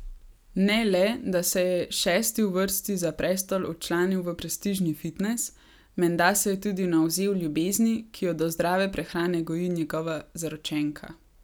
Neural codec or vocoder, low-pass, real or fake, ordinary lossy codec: none; none; real; none